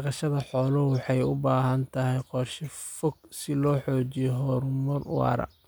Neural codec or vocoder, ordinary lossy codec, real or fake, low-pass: none; none; real; none